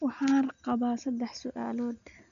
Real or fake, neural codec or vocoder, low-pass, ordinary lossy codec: real; none; 7.2 kHz; none